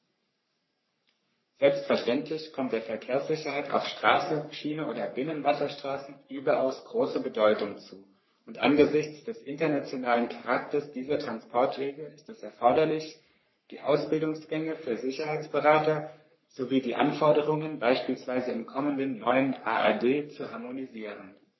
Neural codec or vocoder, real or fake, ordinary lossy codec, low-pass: codec, 44.1 kHz, 3.4 kbps, Pupu-Codec; fake; MP3, 24 kbps; 7.2 kHz